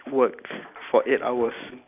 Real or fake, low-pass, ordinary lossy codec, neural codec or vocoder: real; 3.6 kHz; none; none